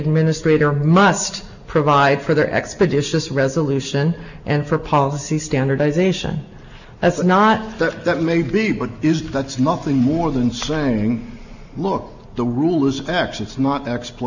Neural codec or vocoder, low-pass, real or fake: none; 7.2 kHz; real